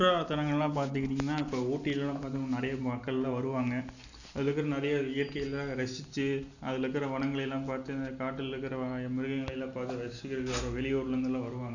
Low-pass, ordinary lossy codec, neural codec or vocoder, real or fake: 7.2 kHz; none; none; real